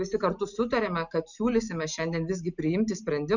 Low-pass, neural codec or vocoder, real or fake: 7.2 kHz; none; real